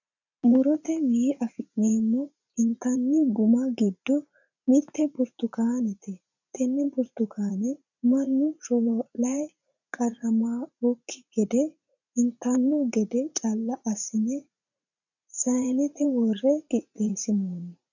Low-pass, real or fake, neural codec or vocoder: 7.2 kHz; fake; vocoder, 44.1 kHz, 80 mel bands, Vocos